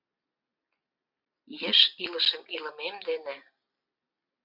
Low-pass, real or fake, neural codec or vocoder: 5.4 kHz; real; none